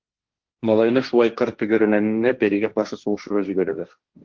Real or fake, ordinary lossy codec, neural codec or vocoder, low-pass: fake; Opus, 16 kbps; codec, 16 kHz, 1.1 kbps, Voila-Tokenizer; 7.2 kHz